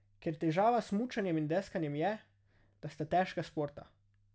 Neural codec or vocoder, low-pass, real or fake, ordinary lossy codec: none; none; real; none